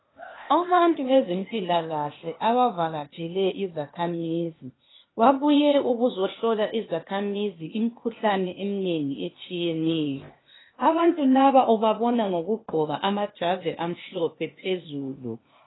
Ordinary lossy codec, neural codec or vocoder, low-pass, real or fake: AAC, 16 kbps; codec, 16 kHz, 0.8 kbps, ZipCodec; 7.2 kHz; fake